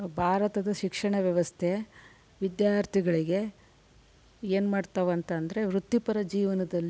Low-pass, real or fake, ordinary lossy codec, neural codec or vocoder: none; real; none; none